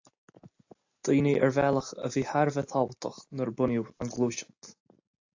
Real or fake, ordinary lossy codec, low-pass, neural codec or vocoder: real; AAC, 48 kbps; 7.2 kHz; none